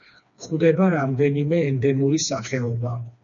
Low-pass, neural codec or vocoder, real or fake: 7.2 kHz; codec, 16 kHz, 2 kbps, FreqCodec, smaller model; fake